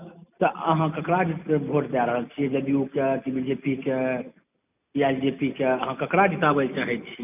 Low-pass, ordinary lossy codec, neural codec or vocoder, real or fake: 3.6 kHz; none; none; real